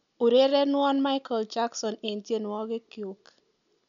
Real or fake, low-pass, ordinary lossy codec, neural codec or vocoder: real; 7.2 kHz; none; none